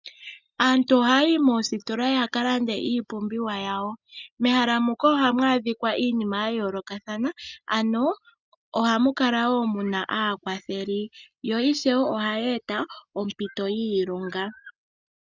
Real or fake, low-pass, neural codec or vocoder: real; 7.2 kHz; none